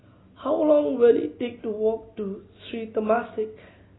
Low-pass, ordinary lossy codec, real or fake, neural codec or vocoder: 7.2 kHz; AAC, 16 kbps; real; none